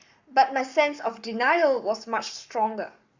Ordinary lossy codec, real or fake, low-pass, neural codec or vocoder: Opus, 64 kbps; fake; 7.2 kHz; codec, 44.1 kHz, 7.8 kbps, Pupu-Codec